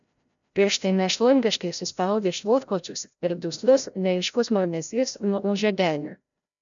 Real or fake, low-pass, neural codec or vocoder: fake; 7.2 kHz; codec, 16 kHz, 0.5 kbps, FreqCodec, larger model